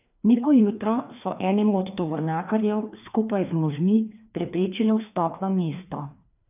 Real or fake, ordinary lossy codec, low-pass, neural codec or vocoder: fake; none; 3.6 kHz; codec, 16 kHz, 2 kbps, FreqCodec, larger model